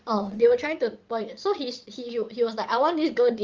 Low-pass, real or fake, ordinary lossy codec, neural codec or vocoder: 7.2 kHz; fake; Opus, 24 kbps; vocoder, 22.05 kHz, 80 mel bands, WaveNeXt